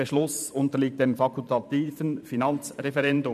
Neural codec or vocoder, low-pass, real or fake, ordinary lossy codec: vocoder, 44.1 kHz, 128 mel bands every 512 samples, BigVGAN v2; 14.4 kHz; fake; none